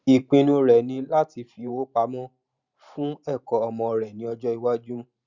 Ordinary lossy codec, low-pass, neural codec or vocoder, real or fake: Opus, 64 kbps; 7.2 kHz; vocoder, 44.1 kHz, 128 mel bands every 512 samples, BigVGAN v2; fake